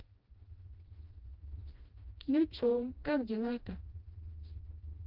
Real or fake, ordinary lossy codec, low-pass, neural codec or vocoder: fake; Opus, 32 kbps; 5.4 kHz; codec, 16 kHz, 1 kbps, FreqCodec, smaller model